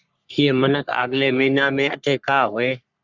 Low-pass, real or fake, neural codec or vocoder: 7.2 kHz; fake; codec, 44.1 kHz, 3.4 kbps, Pupu-Codec